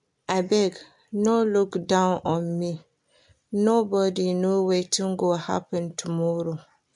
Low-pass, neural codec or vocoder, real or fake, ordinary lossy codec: 10.8 kHz; none; real; MP3, 64 kbps